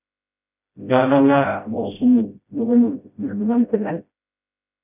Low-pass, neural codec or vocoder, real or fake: 3.6 kHz; codec, 16 kHz, 0.5 kbps, FreqCodec, smaller model; fake